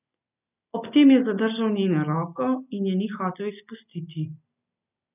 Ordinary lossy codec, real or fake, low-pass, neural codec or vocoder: none; real; 3.6 kHz; none